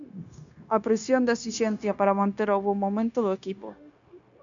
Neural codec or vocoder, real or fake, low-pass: codec, 16 kHz, 0.9 kbps, LongCat-Audio-Codec; fake; 7.2 kHz